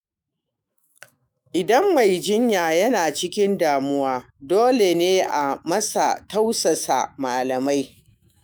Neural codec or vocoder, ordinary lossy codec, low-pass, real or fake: autoencoder, 48 kHz, 128 numbers a frame, DAC-VAE, trained on Japanese speech; none; none; fake